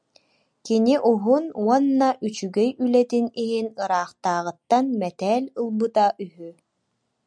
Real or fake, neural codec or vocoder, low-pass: real; none; 9.9 kHz